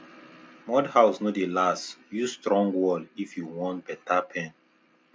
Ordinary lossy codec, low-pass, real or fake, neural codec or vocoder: none; none; real; none